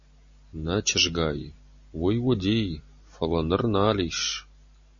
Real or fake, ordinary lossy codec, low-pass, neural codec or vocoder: real; MP3, 32 kbps; 7.2 kHz; none